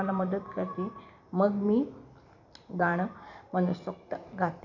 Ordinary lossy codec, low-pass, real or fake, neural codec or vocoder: none; 7.2 kHz; fake; vocoder, 44.1 kHz, 128 mel bands every 256 samples, BigVGAN v2